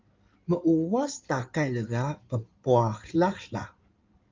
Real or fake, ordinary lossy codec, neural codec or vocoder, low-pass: fake; Opus, 24 kbps; codec, 16 kHz in and 24 kHz out, 2.2 kbps, FireRedTTS-2 codec; 7.2 kHz